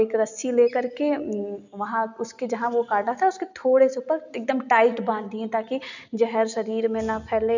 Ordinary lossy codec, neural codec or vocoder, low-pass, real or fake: none; none; 7.2 kHz; real